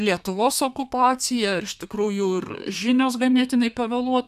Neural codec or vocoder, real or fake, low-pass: codec, 32 kHz, 1.9 kbps, SNAC; fake; 14.4 kHz